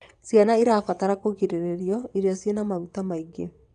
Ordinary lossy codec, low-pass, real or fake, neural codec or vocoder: none; 9.9 kHz; fake; vocoder, 22.05 kHz, 80 mel bands, WaveNeXt